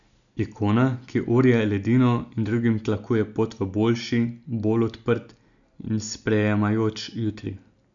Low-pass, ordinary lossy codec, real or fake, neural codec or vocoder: 7.2 kHz; none; real; none